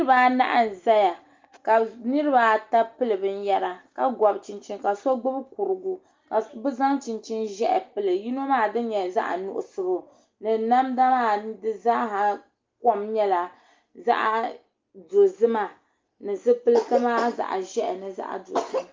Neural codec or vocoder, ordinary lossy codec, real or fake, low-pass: none; Opus, 32 kbps; real; 7.2 kHz